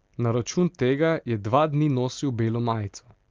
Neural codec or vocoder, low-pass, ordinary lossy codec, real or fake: none; 7.2 kHz; Opus, 32 kbps; real